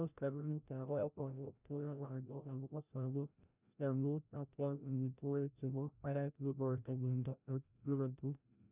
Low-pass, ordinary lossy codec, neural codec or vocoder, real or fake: 3.6 kHz; none; codec, 16 kHz, 0.5 kbps, FreqCodec, larger model; fake